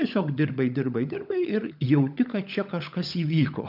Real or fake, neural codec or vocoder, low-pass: fake; codec, 16 kHz, 16 kbps, FunCodec, trained on LibriTTS, 50 frames a second; 5.4 kHz